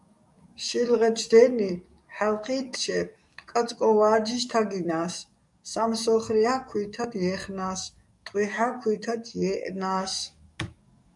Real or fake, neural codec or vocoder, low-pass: fake; codec, 44.1 kHz, 7.8 kbps, DAC; 10.8 kHz